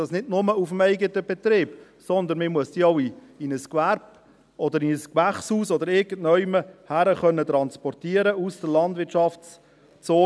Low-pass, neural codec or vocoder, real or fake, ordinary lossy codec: none; none; real; none